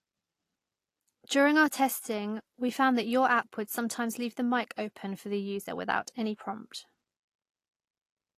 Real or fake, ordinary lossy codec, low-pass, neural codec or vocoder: real; AAC, 48 kbps; 14.4 kHz; none